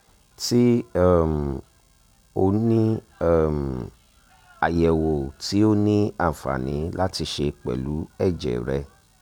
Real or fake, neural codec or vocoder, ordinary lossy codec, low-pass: real; none; none; 19.8 kHz